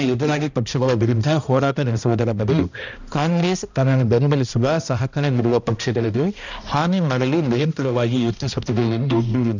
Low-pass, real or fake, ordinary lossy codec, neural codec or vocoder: 7.2 kHz; fake; none; codec, 16 kHz, 1 kbps, X-Codec, HuBERT features, trained on balanced general audio